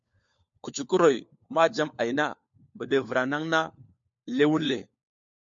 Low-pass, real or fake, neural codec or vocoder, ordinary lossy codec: 7.2 kHz; fake; codec, 16 kHz, 4 kbps, FunCodec, trained on LibriTTS, 50 frames a second; MP3, 48 kbps